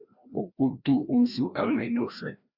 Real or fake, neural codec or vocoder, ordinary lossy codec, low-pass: fake; codec, 16 kHz, 1 kbps, FreqCodec, larger model; Opus, 64 kbps; 5.4 kHz